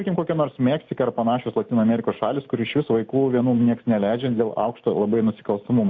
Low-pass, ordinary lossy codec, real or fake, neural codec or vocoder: 7.2 kHz; AAC, 48 kbps; real; none